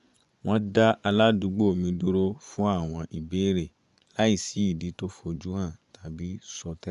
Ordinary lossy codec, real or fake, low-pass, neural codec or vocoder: none; fake; 10.8 kHz; vocoder, 24 kHz, 100 mel bands, Vocos